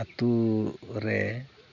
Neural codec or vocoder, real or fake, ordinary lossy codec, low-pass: none; real; none; 7.2 kHz